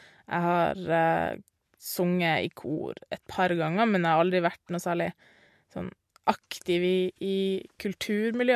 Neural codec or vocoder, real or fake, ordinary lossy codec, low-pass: none; real; MP3, 64 kbps; 14.4 kHz